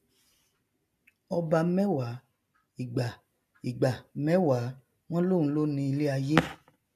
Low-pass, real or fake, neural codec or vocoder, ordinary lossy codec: 14.4 kHz; real; none; none